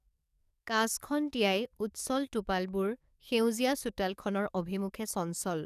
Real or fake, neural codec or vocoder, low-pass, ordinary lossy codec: fake; codec, 44.1 kHz, 7.8 kbps, DAC; 14.4 kHz; none